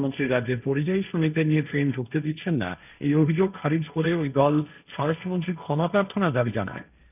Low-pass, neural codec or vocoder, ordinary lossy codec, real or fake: 3.6 kHz; codec, 16 kHz, 1.1 kbps, Voila-Tokenizer; none; fake